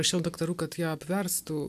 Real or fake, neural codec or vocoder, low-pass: real; none; 14.4 kHz